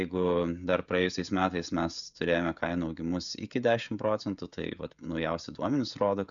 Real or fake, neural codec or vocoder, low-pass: fake; codec, 16 kHz, 16 kbps, FreqCodec, smaller model; 7.2 kHz